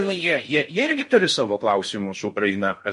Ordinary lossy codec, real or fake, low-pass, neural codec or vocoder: MP3, 48 kbps; fake; 10.8 kHz; codec, 16 kHz in and 24 kHz out, 0.6 kbps, FocalCodec, streaming, 4096 codes